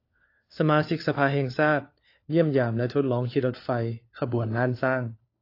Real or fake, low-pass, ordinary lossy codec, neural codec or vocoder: fake; 5.4 kHz; AAC, 32 kbps; codec, 16 kHz, 4 kbps, FunCodec, trained on LibriTTS, 50 frames a second